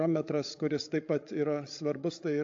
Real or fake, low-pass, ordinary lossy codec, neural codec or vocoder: fake; 7.2 kHz; AAC, 48 kbps; codec, 16 kHz, 16 kbps, FreqCodec, larger model